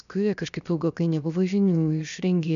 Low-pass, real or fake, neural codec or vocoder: 7.2 kHz; fake; codec, 16 kHz, 0.7 kbps, FocalCodec